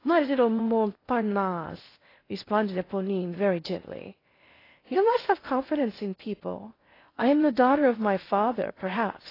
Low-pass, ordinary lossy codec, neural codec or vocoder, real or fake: 5.4 kHz; AAC, 24 kbps; codec, 16 kHz in and 24 kHz out, 0.6 kbps, FocalCodec, streaming, 4096 codes; fake